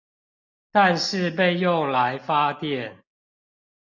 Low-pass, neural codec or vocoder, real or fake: 7.2 kHz; none; real